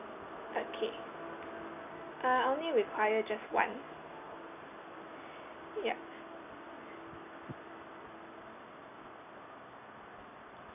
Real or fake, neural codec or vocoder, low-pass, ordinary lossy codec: real; none; 3.6 kHz; none